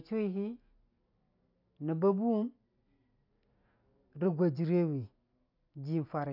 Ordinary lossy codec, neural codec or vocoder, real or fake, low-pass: none; none; real; 5.4 kHz